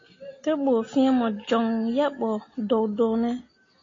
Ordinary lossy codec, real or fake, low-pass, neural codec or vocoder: AAC, 32 kbps; real; 7.2 kHz; none